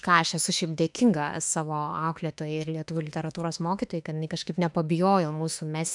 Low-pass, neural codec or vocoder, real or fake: 10.8 kHz; autoencoder, 48 kHz, 32 numbers a frame, DAC-VAE, trained on Japanese speech; fake